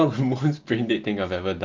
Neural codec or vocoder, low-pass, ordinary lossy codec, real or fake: none; 7.2 kHz; Opus, 24 kbps; real